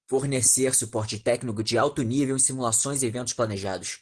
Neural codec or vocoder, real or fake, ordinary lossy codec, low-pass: none; real; Opus, 16 kbps; 9.9 kHz